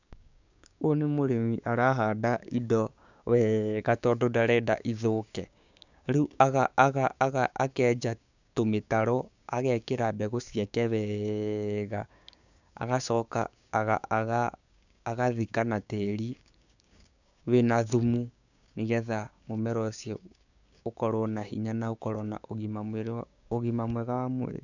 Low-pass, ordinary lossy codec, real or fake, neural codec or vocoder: 7.2 kHz; none; fake; codec, 16 kHz, 6 kbps, DAC